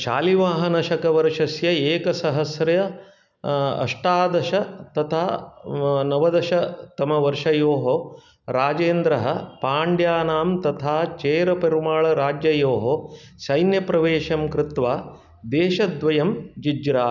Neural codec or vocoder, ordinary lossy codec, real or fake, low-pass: none; none; real; 7.2 kHz